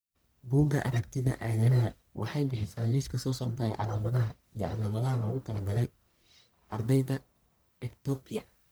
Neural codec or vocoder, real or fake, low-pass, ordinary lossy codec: codec, 44.1 kHz, 1.7 kbps, Pupu-Codec; fake; none; none